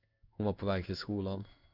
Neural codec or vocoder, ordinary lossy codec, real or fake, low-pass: codec, 16 kHz in and 24 kHz out, 1 kbps, XY-Tokenizer; Opus, 64 kbps; fake; 5.4 kHz